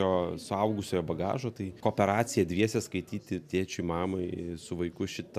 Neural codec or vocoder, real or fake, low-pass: none; real; 14.4 kHz